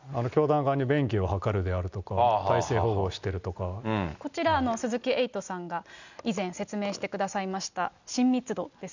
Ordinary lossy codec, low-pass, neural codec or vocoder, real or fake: none; 7.2 kHz; none; real